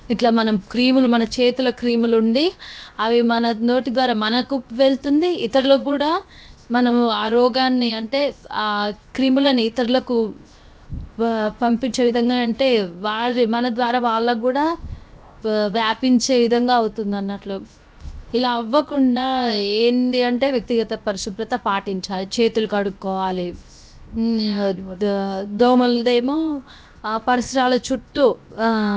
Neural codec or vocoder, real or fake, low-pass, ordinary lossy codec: codec, 16 kHz, 0.7 kbps, FocalCodec; fake; none; none